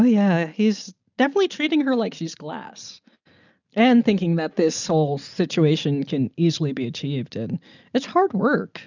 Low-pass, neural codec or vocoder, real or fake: 7.2 kHz; none; real